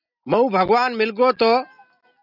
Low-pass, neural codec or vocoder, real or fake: 5.4 kHz; none; real